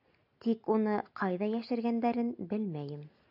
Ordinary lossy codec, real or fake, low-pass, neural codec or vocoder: AAC, 48 kbps; real; 5.4 kHz; none